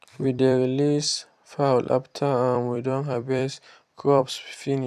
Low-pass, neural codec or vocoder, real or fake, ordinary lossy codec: 14.4 kHz; vocoder, 44.1 kHz, 128 mel bands every 256 samples, BigVGAN v2; fake; none